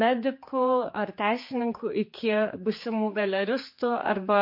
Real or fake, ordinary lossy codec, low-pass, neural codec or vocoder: fake; MP3, 32 kbps; 5.4 kHz; codec, 16 kHz, 4 kbps, X-Codec, HuBERT features, trained on general audio